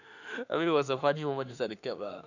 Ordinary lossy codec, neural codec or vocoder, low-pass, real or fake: none; autoencoder, 48 kHz, 32 numbers a frame, DAC-VAE, trained on Japanese speech; 7.2 kHz; fake